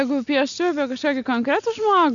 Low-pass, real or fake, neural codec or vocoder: 7.2 kHz; real; none